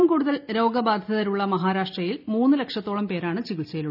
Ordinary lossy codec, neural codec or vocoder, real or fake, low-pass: none; none; real; 5.4 kHz